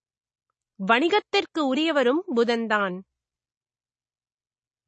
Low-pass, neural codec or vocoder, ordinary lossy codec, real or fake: 10.8 kHz; none; MP3, 32 kbps; real